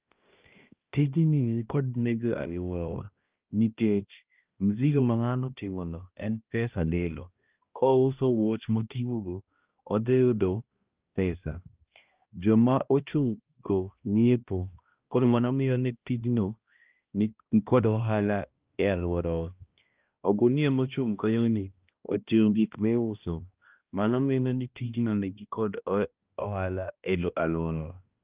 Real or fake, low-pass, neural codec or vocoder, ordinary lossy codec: fake; 3.6 kHz; codec, 16 kHz, 1 kbps, X-Codec, HuBERT features, trained on balanced general audio; Opus, 32 kbps